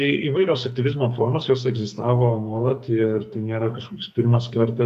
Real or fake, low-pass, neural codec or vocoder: fake; 14.4 kHz; codec, 44.1 kHz, 2.6 kbps, SNAC